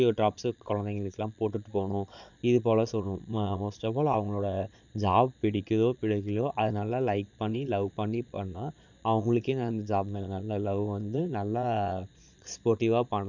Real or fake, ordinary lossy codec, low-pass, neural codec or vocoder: fake; none; 7.2 kHz; vocoder, 22.05 kHz, 80 mel bands, Vocos